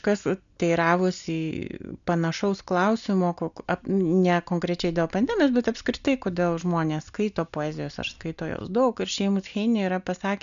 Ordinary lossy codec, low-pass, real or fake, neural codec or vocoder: MP3, 96 kbps; 7.2 kHz; real; none